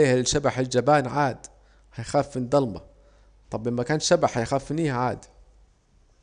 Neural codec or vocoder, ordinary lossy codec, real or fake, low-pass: none; none; real; 9.9 kHz